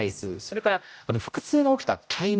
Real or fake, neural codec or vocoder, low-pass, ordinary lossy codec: fake; codec, 16 kHz, 0.5 kbps, X-Codec, HuBERT features, trained on general audio; none; none